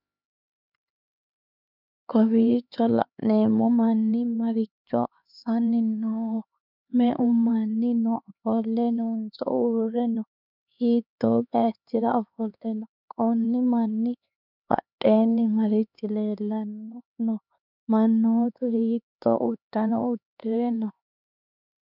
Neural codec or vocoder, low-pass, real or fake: codec, 16 kHz, 4 kbps, X-Codec, HuBERT features, trained on LibriSpeech; 5.4 kHz; fake